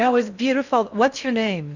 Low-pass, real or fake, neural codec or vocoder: 7.2 kHz; fake; codec, 16 kHz in and 24 kHz out, 0.6 kbps, FocalCodec, streaming, 4096 codes